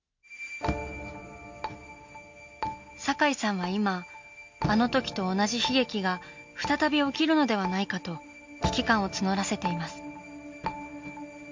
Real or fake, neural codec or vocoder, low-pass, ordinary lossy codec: real; none; 7.2 kHz; MP3, 48 kbps